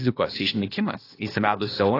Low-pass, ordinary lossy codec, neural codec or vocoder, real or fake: 5.4 kHz; AAC, 24 kbps; codec, 16 kHz, 0.8 kbps, ZipCodec; fake